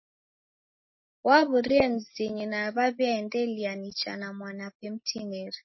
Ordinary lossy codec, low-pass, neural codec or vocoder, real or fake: MP3, 24 kbps; 7.2 kHz; none; real